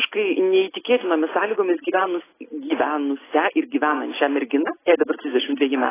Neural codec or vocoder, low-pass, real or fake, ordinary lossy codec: none; 3.6 kHz; real; AAC, 16 kbps